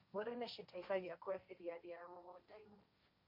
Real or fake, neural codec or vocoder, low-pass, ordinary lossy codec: fake; codec, 16 kHz, 1.1 kbps, Voila-Tokenizer; 5.4 kHz; MP3, 32 kbps